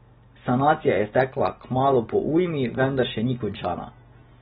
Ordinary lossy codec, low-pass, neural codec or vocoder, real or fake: AAC, 16 kbps; 10.8 kHz; none; real